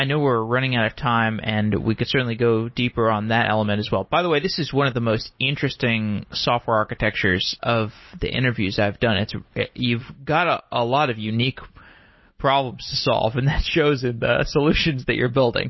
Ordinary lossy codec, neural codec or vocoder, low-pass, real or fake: MP3, 24 kbps; none; 7.2 kHz; real